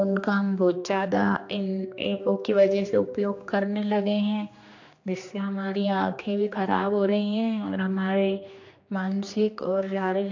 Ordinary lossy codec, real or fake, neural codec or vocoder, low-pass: AAC, 48 kbps; fake; codec, 16 kHz, 2 kbps, X-Codec, HuBERT features, trained on general audio; 7.2 kHz